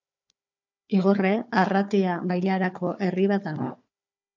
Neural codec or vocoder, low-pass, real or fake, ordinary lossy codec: codec, 16 kHz, 4 kbps, FunCodec, trained on Chinese and English, 50 frames a second; 7.2 kHz; fake; MP3, 64 kbps